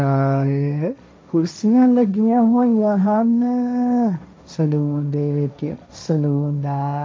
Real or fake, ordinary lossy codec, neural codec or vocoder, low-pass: fake; none; codec, 16 kHz, 1.1 kbps, Voila-Tokenizer; none